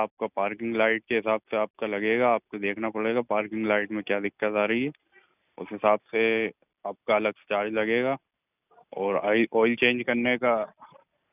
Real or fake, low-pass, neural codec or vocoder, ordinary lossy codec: real; 3.6 kHz; none; none